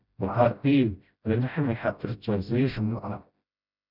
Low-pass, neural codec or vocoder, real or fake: 5.4 kHz; codec, 16 kHz, 0.5 kbps, FreqCodec, smaller model; fake